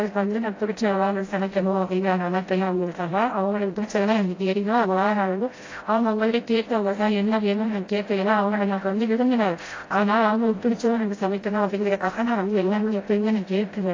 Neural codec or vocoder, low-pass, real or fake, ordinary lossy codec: codec, 16 kHz, 0.5 kbps, FreqCodec, smaller model; 7.2 kHz; fake; AAC, 32 kbps